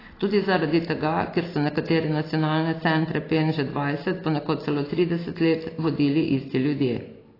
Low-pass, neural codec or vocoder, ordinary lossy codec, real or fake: 5.4 kHz; none; AAC, 24 kbps; real